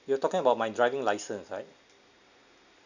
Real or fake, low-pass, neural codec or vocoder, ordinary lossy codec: real; 7.2 kHz; none; none